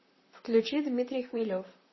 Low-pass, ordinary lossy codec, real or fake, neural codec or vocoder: 7.2 kHz; MP3, 24 kbps; fake; vocoder, 44.1 kHz, 128 mel bands, Pupu-Vocoder